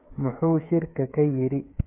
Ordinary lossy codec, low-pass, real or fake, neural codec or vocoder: MP3, 32 kbps; 3.6 kHz; fake; codec, 16 kHz, 8 kbps, FreqCodec, smaller model